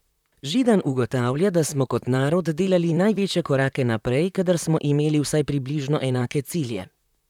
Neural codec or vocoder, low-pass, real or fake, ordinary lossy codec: vocoder, 44.1 kHz, 128 mel bands, Pupu-Vocoder; 19.8 kHz; fake; none